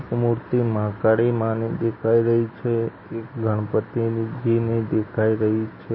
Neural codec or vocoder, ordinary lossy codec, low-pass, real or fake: none; MP3, 24 kbps; 7.2 kHz; real